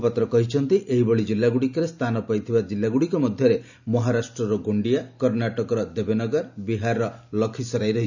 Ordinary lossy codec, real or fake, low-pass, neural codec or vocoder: none; real; 7.2 kHz; none